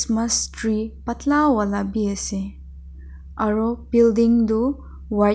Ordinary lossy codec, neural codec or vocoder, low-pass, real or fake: none; none; none; real